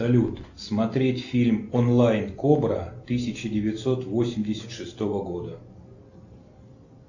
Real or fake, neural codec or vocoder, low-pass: real; none; 7.2 kHz